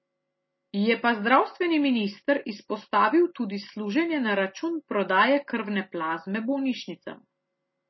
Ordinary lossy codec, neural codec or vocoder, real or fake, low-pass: MP3, 24 kbps; none; real; 7.2 kHz